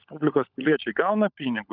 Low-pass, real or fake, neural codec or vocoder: 5.4 kHz; fake; codec, 16 kHz, 16 kbps, FunCodec, trained on LibriTTS, 50 frames a second